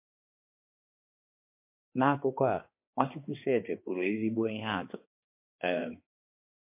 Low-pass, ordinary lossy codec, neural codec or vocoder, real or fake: 3.6 kHz; MP3, 24 kbps; codec, 16 kHz, 2 kbps, X-Codec, HuBERT features, trained on balanced general audio; fake